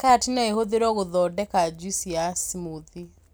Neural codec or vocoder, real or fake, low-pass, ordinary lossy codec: none; real; none; none